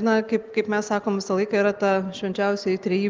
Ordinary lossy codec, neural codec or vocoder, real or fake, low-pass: Opus, 32 kbps; none; real; 7.2 kHz